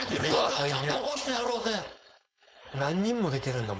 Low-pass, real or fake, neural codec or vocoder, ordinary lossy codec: none; fake; codec, 16 kHz, 4.8 kbps, FACodec; none